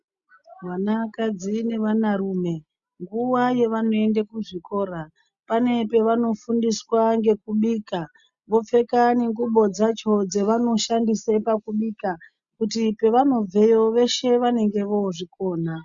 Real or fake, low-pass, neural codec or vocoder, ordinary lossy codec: real; 7.2 kHz; none; Opus, 64 kbps